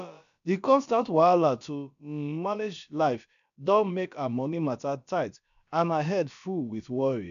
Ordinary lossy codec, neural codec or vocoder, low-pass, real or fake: none; codec, 16 kHz, about 1 kbps, DyCAST, with the encoder's durations; 7.2 kHz; fake